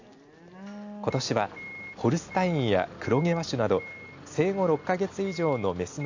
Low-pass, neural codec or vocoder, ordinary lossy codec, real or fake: 7.2 kHz; none; AAC, 48 kbps; real